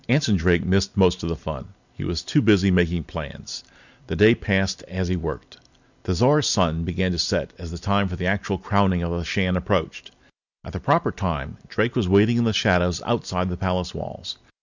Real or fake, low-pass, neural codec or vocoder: real; 7.2 kHz; none